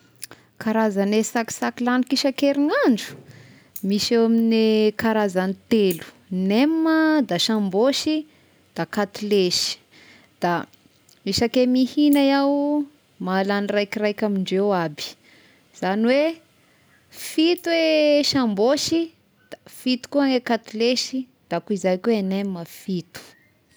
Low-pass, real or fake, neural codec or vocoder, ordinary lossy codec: none; real; none; none